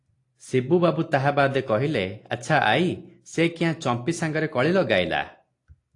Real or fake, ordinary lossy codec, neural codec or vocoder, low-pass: real; AAC, 48 kbps; none; 10.8 kHz